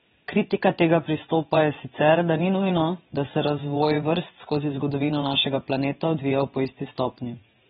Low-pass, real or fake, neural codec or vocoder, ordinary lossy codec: 9.9 kHz; fake; vocoder, 22.05 kHz, 80 mel bands, WaveNeXt; AAC, 16 kbps